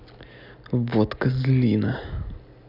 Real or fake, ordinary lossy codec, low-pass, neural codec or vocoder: real; none; 5.4 kHz; none